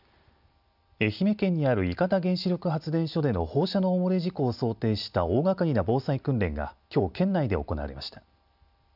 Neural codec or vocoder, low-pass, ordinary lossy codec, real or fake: none; 5.4 kHz; none; real